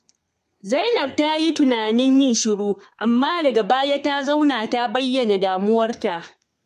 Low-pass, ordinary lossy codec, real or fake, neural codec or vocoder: 14.4 kHz; MP3, 64 kbps; fake; codec, 32 kHz, 1.9 kbps, SNAC